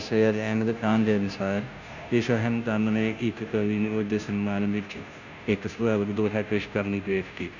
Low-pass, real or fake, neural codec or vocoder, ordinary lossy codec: 7.2 kHz; fake; codec, 16 kHz, 0.5 kbps, FunCodec, trained on Chinese and English, 25 frames a second; none